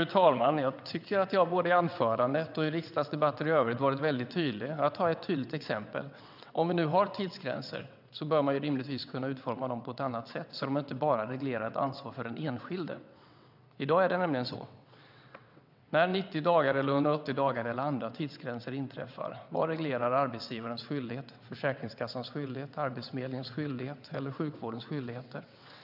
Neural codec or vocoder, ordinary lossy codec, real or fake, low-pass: vocoder, 22.05 kHz, 80 mel bands, WaveNeXt; none; fake; 5.4 kHz